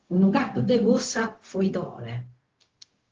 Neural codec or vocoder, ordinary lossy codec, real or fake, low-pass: codec, 16 kHz, 0.4 kbps, LongCat-Audio-Codec; Opus, 16 kbps; fake; 7.2 kHz